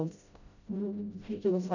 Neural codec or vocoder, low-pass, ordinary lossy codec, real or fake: codec, 16 kHz, 0.5 kbps, FreqCodec, smaller model; 7.2 kHz; none; fake